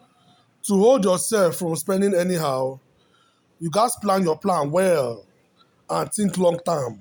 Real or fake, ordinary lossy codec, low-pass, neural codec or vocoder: real; none; none; none